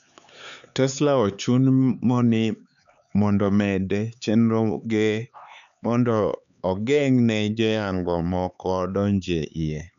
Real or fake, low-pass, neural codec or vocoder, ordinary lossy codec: fake; 7.2 kHz; codec, 16 kHz, 4 kbps, X-Codec, HuBERT features, trained on LibriSpeech; none